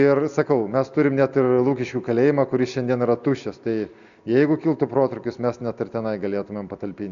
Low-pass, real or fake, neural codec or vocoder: 7.2 kHz; real; none